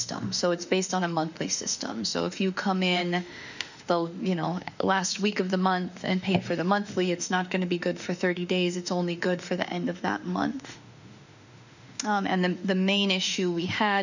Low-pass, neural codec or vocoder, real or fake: 7.2 kHz; autoencoder, 48 kHz, 32 numbers a frame, DAC-VAE, trained on Japanese speech; fake